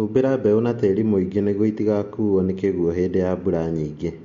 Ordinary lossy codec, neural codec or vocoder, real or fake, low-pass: MP3, 48 kbps; none; real; 7.2 kHz